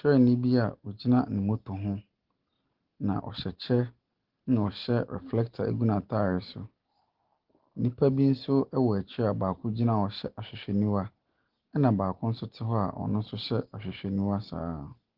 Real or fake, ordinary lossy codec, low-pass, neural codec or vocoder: real; Opus, 16 kbps; 5.4 kHz; none